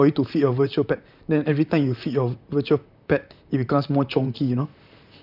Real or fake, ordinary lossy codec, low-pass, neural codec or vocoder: fake; none; 5.4 kHz; vocoder, 44.1 kHz, 128 mel bands, Pupu-Vocoder